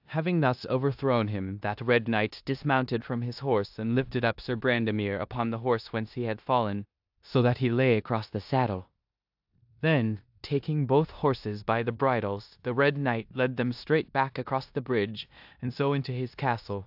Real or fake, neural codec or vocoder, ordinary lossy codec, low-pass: fake; codec, 16 kHz in and 24 kHz out, 0.9 kbps, LongCat-Audio-Codec, four codebook decoder; AAC, 48 kbps; 5.4 kHz